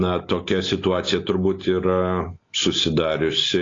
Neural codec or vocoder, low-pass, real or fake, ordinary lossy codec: none; 7.2 kHz; real; AAC, 32 kbps